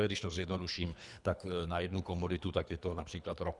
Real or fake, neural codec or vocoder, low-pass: fake; codec, 24 kHz, 3 kbps, HILCodec; 10.8 kHz